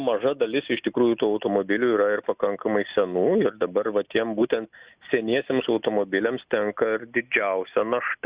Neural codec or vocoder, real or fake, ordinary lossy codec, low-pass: none; real; Opus, 64 kbps; 3.6 kHz